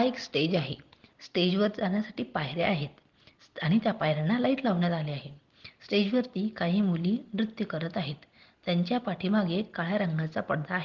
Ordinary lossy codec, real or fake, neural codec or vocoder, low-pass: Opus, 16 kbps; real; none; 7.2 kHz